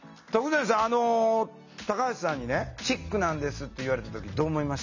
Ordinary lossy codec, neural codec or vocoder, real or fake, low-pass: none; none; real; 7.2 kHz